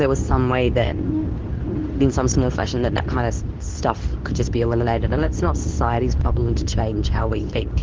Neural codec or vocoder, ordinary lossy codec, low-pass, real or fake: codec, 24 kHz, 0.9 kbps, WavTokenizer, medium speech release version 2; Opus, 16 kbps; 7.2 kHz; fake